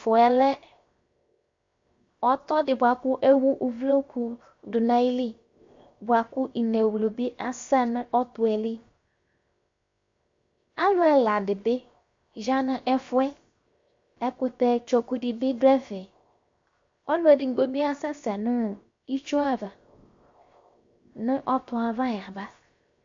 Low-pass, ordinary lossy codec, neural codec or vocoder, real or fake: 7.2 kHz; MP3, 48 kbps; codec, 16 kHz, 0.7 kbps, FocalCodec; fake